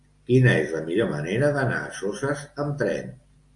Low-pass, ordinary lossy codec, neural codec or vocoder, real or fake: 10.8 kHz; AAC, 64 kbps; none; real